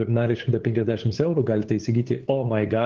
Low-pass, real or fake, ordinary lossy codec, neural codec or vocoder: 7.2 kHz; fake; Opus, 16 kbps; codec, 16 kHz, 8 kbps, FunCodec, trained on LibriTTS, 25 frames a second